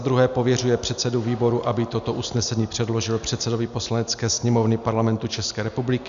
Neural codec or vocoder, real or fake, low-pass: none; real; 7.2 kHz